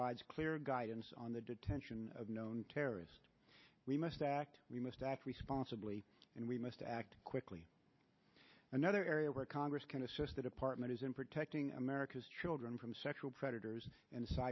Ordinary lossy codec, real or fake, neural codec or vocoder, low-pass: MP3, 24 kbps; real; none; 7.2 kHz